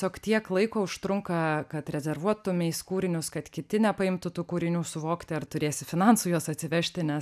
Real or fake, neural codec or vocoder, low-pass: real; none; 14.4 kHz